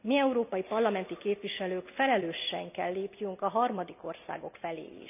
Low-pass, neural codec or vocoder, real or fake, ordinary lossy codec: 3.6 kHz; none; real; none